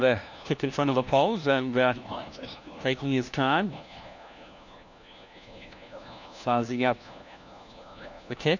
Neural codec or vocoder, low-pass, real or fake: codec, 16 kHz, 1 kbps, FunCodec, trained on LibriTTS, 50 frames a second; 7.2 kHz; fake